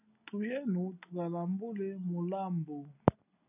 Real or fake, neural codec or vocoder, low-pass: real; none; 3.6 kHz